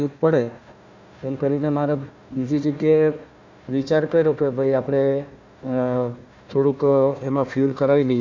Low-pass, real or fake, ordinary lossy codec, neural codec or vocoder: 7.2 kHz; fake; MP3, 64 kbps; codec, 16 kHz, 1 kbps, FunCodec, trained on Chinese and English, 50 frames a second